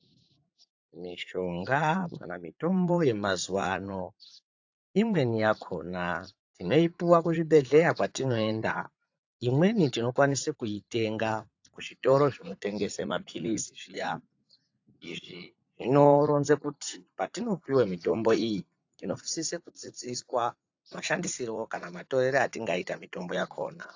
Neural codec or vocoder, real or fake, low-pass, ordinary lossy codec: vocoder, 44.1 kHz, 80 mel bands, Vocos; fake; 7.2 kHz; AAC, 48 kbps